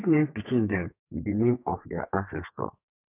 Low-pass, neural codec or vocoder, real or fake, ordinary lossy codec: 3.6 kHz; codec, 32 kHz, 1.9 kbps, SNAC; fake; none